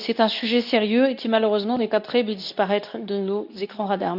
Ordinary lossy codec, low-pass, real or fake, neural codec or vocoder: none; 5.4 kHz; fake; codec, 24 kHz, 0.9 kbps, WavTokenizer, medium speech release version 2